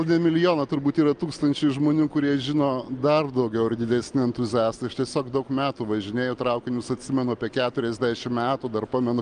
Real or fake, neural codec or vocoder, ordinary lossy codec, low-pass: real; none; Opus, 32 kbps; 9.9 kHz